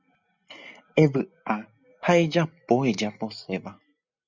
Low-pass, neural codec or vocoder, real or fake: 7.2 kHz; none; real